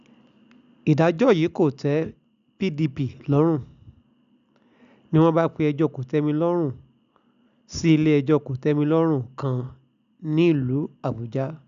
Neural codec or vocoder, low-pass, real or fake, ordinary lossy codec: none; 7.2 kHz; real; none